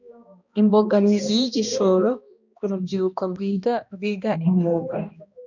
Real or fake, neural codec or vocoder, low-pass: fake; codec, 16 kHz, 1 kbps, X-Codec, HuBERT features, trained on balanced general audio; 7.2 kHz